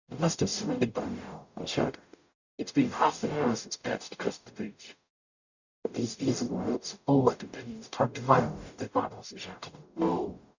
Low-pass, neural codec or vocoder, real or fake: 7.2 kHz; codec, 44.1 kHz, 0.9 kbps, DAC; fake